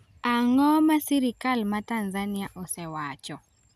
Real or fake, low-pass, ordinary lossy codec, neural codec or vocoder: real; 14.4 kHz; none; none